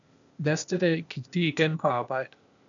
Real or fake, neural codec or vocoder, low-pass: fake; codec, 16 kHz, 0.8 kbps, ZipCodec; 7.2 kHz